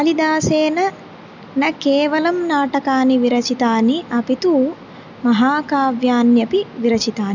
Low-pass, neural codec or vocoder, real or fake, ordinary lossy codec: 7.2 kHz; none; real; none